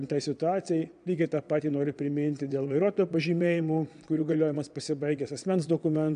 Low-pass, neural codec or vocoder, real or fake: 9.9 kHz; vocoder, 22.05 kHz, 80 mel bands, Vocos; fake